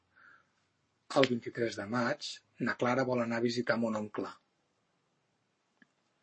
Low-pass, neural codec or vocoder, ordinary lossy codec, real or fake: 9.9 kHz; codec, 44.1 kHz, 7.8 kbps, Pupu-Codec; MP3, 32 kbps; fake